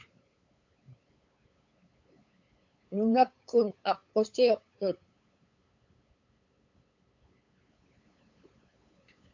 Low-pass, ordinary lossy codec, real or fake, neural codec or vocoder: 7.2 kHz; AAC, 48 kbps; fake; codec, 16 kHz, 8 kbps, FunCodec, trained on LibriTTS, 25 frames a second